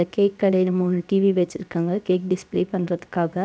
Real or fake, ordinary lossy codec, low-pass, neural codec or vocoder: fake; none; none; codec, 16 kHz, about 1 kbps, DyCAST, with the encoder's durations